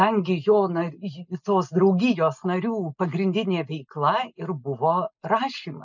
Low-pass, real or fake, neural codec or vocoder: 7.2 kHz; real; none